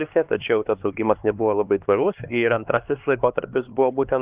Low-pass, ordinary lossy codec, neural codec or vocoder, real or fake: 3.6 kHz; Opus, 24 kbps; codec, 16 kHz, 2 kbps, X-Codec, HuBERT features, trained on LibriSpeech; fake